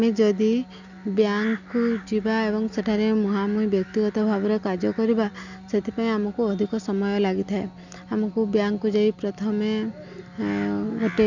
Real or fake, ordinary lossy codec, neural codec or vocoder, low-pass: real; none; none; 7.2 kHz